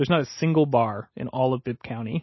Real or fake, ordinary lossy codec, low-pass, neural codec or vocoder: real; MP3, 24 kbps; 7.2 kHz; none